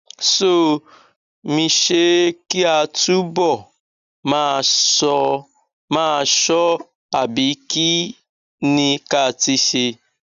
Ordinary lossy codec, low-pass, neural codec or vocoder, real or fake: none; 7.2 kHz; none; real